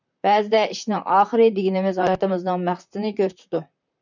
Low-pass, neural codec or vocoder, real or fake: 7.2 kHz; vocoder, 44.1 kHz, 128 mel bands, Pupu-Vocoder; fake